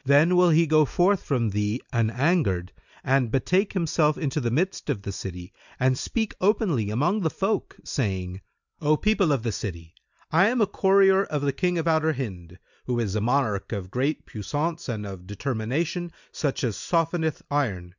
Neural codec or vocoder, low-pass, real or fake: none; 7.2 kHz; real